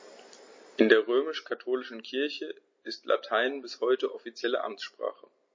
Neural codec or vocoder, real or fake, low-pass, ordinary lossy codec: none; real; 7.2 kHz; MP3, 32 kbps